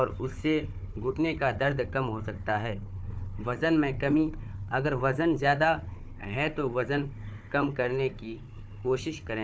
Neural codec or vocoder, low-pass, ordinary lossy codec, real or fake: codec, 16 kHz, 4 kbps, FunCodec, trained on Chinese and English, 50 frames a second; none; none; fake